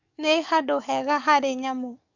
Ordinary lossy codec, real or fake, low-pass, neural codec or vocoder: none; fake; 7.2 kHz; codec, 44.1 kHz, 7.8 kbps, DAC